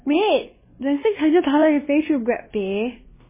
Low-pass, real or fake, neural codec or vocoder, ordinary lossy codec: 3.6 kHz; fake; codec, 16 kHz, 2 kbps, X-Codec, WavLM features, trained on Multilingual LibriSpeech; MP3, 16 kbps